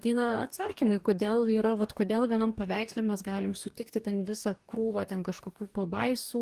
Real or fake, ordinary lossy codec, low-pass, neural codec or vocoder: fake; Opus, 24 kbps; 14.4 kHz; codec, 44.1 kHz, 2.6 kbps, DAC